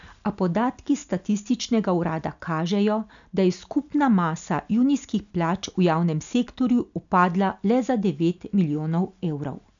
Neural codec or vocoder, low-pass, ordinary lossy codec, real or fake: none; 7.2 kHz; none; real